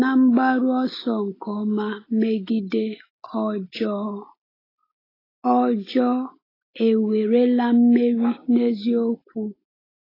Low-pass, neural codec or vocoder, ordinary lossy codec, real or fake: 5.4 kHz; none; AAC, 24 kbps; real